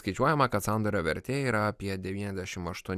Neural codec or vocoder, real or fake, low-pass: none; real; 14.4 kHz